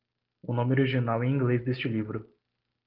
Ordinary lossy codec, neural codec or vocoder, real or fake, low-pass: Opus, 16 kbps; none; real; 5.4 kHz